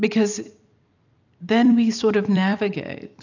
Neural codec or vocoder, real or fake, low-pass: vocoder, 44.1 kHz, 128 mel bands every 256 samples, BigVGAN v2; fake; 7.2 kHz